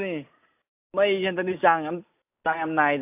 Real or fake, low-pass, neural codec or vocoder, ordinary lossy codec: real; 3.6 kHz; none; AAC, 24 kbps